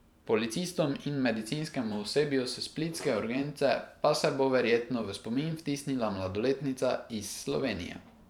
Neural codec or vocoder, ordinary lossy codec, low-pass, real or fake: vocoder, 44.1 kHz, 128 mel bands every 512 samples, BigVGAN v2; none; 19.8 kHz; fake